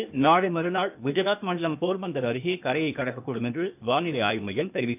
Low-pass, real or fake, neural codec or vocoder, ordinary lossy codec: 3.6 kHz; fake; codec, 16 kHz, 0.8 kbps, ZipCodec; none